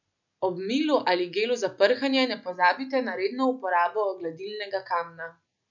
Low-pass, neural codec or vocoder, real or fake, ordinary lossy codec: 7.2 kHz; none; real; none